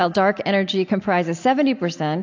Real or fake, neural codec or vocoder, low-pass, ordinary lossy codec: real; none; 7.2 kHz; AAC, 48 kbps